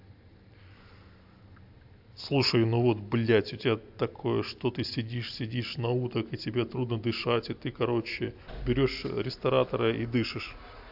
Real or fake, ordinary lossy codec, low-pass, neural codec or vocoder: real; AAC, 48 kbps; 5.4 kHz; none